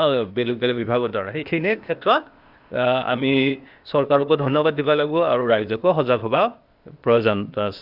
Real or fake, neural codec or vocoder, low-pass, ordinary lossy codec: fake; codec, 16 kHz, 0.8 kbps, ZipCodec; 5.4 kHz; Opus, 64 kbps